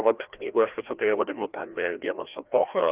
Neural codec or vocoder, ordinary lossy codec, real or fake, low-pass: codec, 16 kHz, 1 kbps, FreqCodec, larger model; Opus, 32 kbps; fake; 3.6 kHz